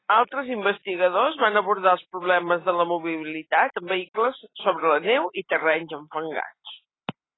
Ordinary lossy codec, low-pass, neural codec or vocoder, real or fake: AAC, 16 kbps; 7.2 kHz; none; real